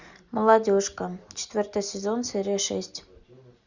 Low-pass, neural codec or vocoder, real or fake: 7.2 kHz; none; real